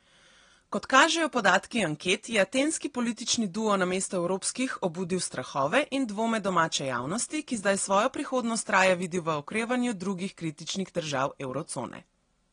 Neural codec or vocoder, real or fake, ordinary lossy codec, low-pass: none; real; AAC, 32 kbps; 9.9 kHz